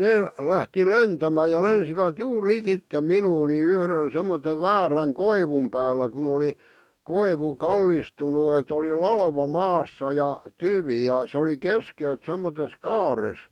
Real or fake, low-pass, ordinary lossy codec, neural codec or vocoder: fake; 19.8 kHz; none; codec, 44.1 kHz, 2.6 kbps, DAC